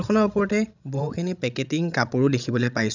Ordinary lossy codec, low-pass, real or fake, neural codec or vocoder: none; 7.2 kHz; fake; codec, 16 kHz, 16 kbps, FreqCodec, larger model